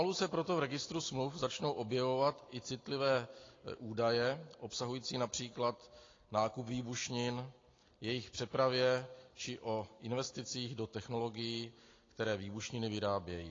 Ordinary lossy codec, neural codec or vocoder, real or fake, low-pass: AAC, 32 kbps; none; real; 7.2 kHz